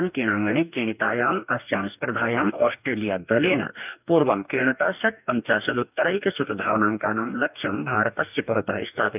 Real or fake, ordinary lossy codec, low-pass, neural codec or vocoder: fake; none; 3.6 kHz; codec, 44.1 kHz, 2.6 kbps, DAC